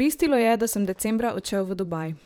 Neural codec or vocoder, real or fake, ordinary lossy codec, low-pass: vocoder, 44.1 kHz, 128 mel bands, Pupu-Vocoder; fake; none; none